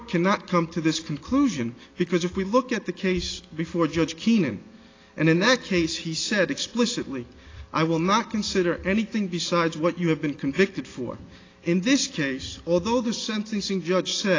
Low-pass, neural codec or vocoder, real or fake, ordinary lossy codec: 7.2 kHz; none; real; AAC, 32 kbps